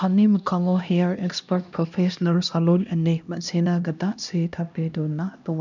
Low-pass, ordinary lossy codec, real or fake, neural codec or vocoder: 7.2 kHz; none; fake; codec, 16 kHz, 1 kbps, X-Codec, HuBERT features, trained on LibriSpeech